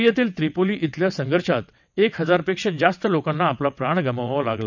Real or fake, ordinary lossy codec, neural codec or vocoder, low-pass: fake; none; vocoder, 22.05 kHz, 80 mel bands, WaveNeXt; 7.2 kHz